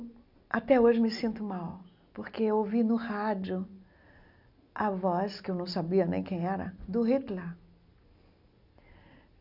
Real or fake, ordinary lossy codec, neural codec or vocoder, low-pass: real; none; none; 5.4 kHz